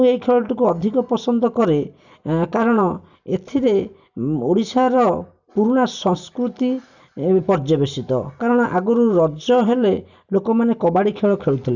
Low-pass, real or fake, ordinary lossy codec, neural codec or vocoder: 7.2 kHz; real; none; none